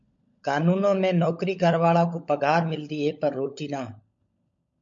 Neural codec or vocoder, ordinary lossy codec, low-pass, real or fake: codec, 16 kHz, 16 kbps, FunCodec, trained on LibriTTS, 50 frames a second; MP3, 48 kbps; 7.2 kHz; fake